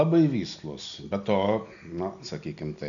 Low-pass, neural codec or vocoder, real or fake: 7.2 kHz; none; real